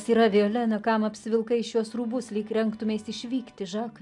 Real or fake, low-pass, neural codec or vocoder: real; 10.8 kHz; none